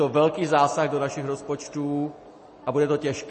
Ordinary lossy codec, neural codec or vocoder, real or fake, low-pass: MP3, 32 kbps; none; real; 10.8 kHz